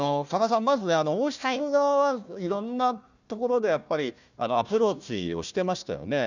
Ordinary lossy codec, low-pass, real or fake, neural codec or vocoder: none; 7.2 kHz; fake; codec, 16 kHz, 1 kbps, FunCodec, trained on Chinese and English, 50 frames a second